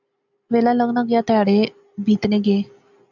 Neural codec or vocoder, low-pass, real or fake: none; 7.2 kHz; real